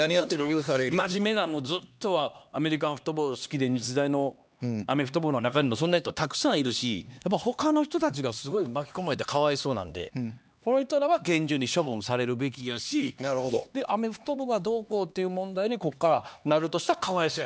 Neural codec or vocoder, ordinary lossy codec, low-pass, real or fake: codec, 16 kHz, 2 kbps, X-Codec, HuBERT features, trained on LibriSpeech; none; none; fake